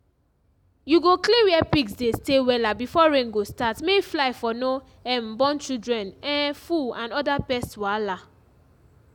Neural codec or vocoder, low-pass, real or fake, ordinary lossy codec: none; 19.8 kHz; real; none